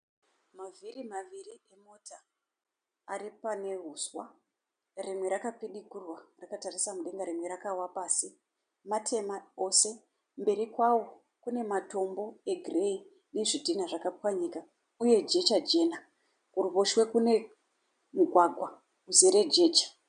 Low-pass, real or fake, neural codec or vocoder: 9.9 kHz; real; none